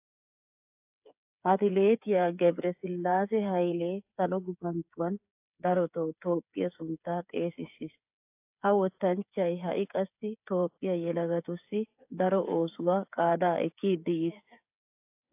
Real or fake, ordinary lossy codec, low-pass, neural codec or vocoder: fake; AAC, 32 kbps; 3.6 kHz; codec, 16 kHz, 8 kbps, FreqCodec, smaller model